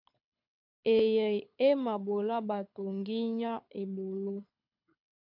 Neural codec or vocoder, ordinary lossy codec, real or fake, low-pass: codec, 24 kHz, 6 kbps, HILCodec; MP3, 48 kbps; fake; 5.4 kHz